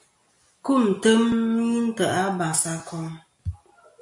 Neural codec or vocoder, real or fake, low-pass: none; real; 10.8 kHz